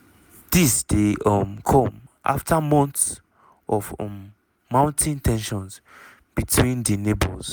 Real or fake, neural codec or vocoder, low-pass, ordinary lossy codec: real; none; none; none